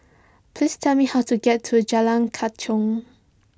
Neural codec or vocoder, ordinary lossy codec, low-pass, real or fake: none; none; none; real